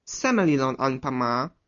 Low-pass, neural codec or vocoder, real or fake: 7.2 kHz; none; real